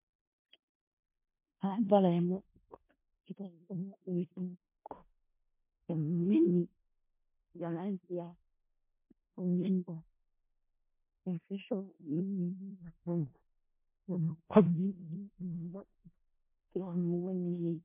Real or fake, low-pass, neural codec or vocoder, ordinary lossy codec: fake; 3.6 kHz; codec, 16 kHz in and 24 kHz out, 0.4 kbps, LongCat-Audio-Codec, four codebook decoder; MP3, 24 kbps